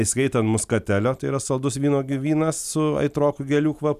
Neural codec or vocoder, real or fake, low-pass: none; real; 14.4 kHz